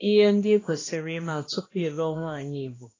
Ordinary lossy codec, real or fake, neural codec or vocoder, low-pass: AAC, 32 kbps; fake; codec, 16 kHz, 1 kbps, X-Codec, HuBERT features, trained on balanced general audio; 7.2 kHz